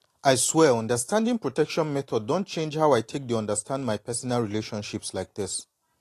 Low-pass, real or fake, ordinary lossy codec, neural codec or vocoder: 14.4 kHz; real; AAC, 48 kbps; none